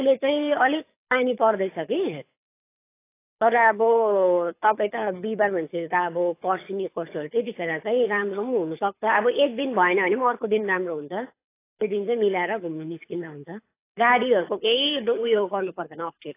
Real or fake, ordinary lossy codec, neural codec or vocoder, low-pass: fake; AAC, 24 kbps; codec, 24 kHz, 6 kbps, HILCodec; 3.6 kHz